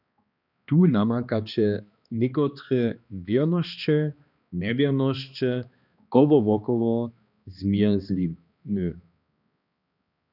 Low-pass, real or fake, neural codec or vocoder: 5.4 kHz; fake; codec, 16 kHz, 2 kbps, X-Codec, HuBERT features, trained on balanced general audio